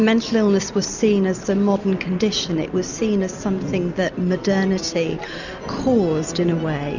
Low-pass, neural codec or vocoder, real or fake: 7.2 kHz; none; real